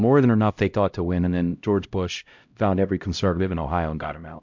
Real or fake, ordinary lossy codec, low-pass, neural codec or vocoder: fake; MP3, 64 kbps; 7.2 kHz; codec, 16 kHz, 0.5 kbps, X-Codec, HuBERT features, trained on LibriSpeech